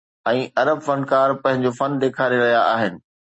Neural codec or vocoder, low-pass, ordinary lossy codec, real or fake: none; 10.8 kHz; MP3, 32 kbps; real